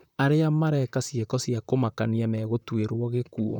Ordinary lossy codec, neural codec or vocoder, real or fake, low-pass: none; vocoder, 44.1 kHz, 128 mel bands, Pupu-Vocoder; fake; 19.8 kHz